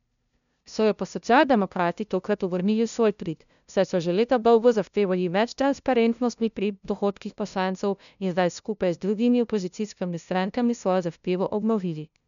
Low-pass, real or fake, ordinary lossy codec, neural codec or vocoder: 7.2 kHz; fake; none; codec, 16 kHz, 0.5 kbps, FunCodec, trained on LibriTTS, 25 frames a second